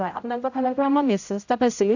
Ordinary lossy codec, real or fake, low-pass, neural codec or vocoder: none; fake; 7.2 kHz; codec, 16 kHz, 0.5 kbps, X-Codec, HuBERT features, trained on general audio